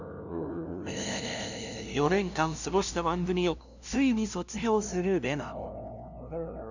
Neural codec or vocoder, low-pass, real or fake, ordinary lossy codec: codec, 16 kHz, 0.5 kbps, FunCodec, trained on LibriTTS, 25 frames a second; 7.2 kHz; fake; none